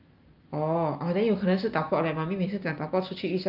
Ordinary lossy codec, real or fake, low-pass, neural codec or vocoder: Opus, 24 kbps; real; 5.4 kHz; none